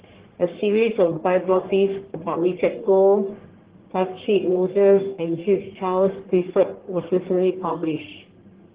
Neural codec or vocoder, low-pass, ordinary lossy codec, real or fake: codec, 44.1 kHz, 1.7 kbps, Pupu-Codec; 3.6 kHz; Opus, 16 kbps; fake